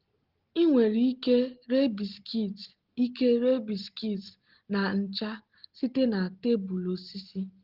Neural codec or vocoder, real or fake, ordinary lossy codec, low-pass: none; real; Opus, 16 kbps; 5.4 kHz